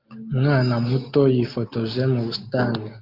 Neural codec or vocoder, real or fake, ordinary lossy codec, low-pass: none; real; Opus, 32 kbps; 5.4 kHz